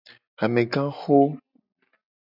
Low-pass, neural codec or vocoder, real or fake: 5.4 kHz; none; real